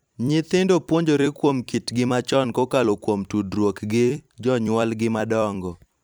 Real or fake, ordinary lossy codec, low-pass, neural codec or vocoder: fake; none; none; vocoder, 44.1 kHz, 128 mel bands every 256 samples, BigVGAN v2